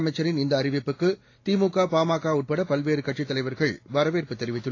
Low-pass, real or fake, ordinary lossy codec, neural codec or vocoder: 7.2 kHz; real; AAC, 32 kbps; none